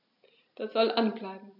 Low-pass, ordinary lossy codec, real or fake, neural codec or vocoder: 5.4 kHz; none; real; none